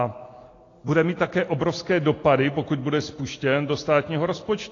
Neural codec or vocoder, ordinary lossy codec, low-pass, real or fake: none; AAC, 32 kbps; 7.2 kHz; real